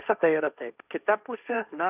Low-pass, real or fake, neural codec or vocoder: 3.6 kHz; fake; codec, 16 kHz, 1.1 kbps, Voila-Tokenizer